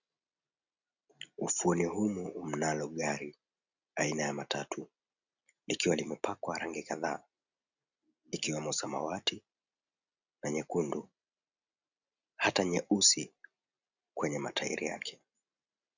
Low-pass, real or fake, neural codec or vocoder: 7.2 kHz; real; none